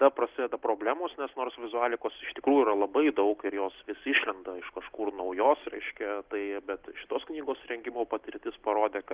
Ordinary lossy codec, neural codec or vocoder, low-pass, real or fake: Opus, 16 kbps; none; 3.6 kHz; real